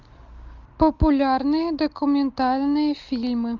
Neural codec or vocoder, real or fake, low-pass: none; real; 7.2 kHz